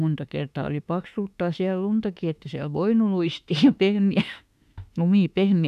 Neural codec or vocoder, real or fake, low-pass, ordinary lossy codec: autoencoder, 48 kHz, 32 numbers a frame, DAC-VAE, trained on Japanese speech; fake; 14.4 kHz; none